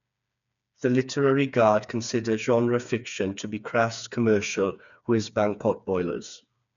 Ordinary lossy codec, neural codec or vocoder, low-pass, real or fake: none; codec, 16 kHz, 4 kbps, FreqCodec, smaller model; 7.2 kHz; fake